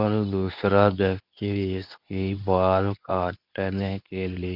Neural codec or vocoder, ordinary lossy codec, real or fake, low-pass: codec, 24 kHz, 0.9 kbps, WavTokenizer, medium speech release version 2; none; fake; 5.4 kHz